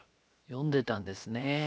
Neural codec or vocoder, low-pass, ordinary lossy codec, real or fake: codec, 16 kHz, 0.7 kbps, FocalCodec; none; none; fake